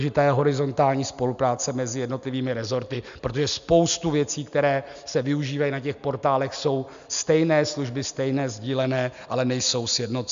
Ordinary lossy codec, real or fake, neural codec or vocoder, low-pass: MP3, 64 kbps; real; none; 7.2 kHz